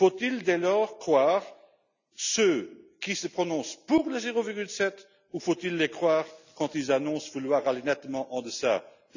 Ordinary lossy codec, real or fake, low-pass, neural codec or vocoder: none; real; 7.2 kHz; none